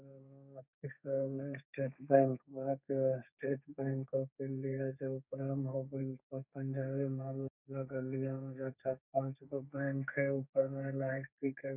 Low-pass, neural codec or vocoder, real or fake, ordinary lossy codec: 3.6 kHz; codec, 32 kHz, 1.9 kbps, SNAC; fake; none